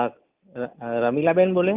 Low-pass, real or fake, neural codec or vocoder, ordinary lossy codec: 3.6 kHz; real; none; Opus, 32 kbps